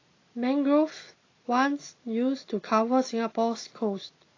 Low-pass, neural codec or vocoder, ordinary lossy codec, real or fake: 7.2 kHz; none; AAC, 32 kbps; real